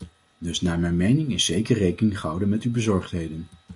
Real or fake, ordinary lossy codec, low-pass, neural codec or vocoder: real; MP3, 64 kbps; 10.8 kHz; none